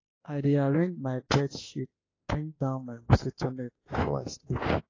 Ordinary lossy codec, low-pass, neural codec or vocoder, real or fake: AAC, 32 kbps; 7.2 kHz; autoencoder, 48 kHz, 32 numbers a frame, DAC-VAE, trained on Japanese speech; fake